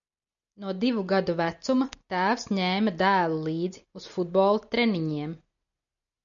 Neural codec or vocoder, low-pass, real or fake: none; 7.2 kHz; real